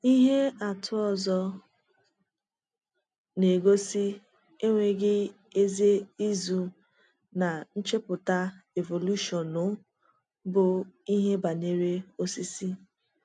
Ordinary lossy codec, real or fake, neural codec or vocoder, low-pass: none; real; none; 9.9 kHz